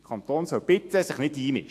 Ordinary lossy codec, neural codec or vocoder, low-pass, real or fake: AAC, 64 kbps; autoencoder, 48 kHz, 128 numbers a frame, DAC-VAE, trained on Japanese speech; 14.4 kHz; fake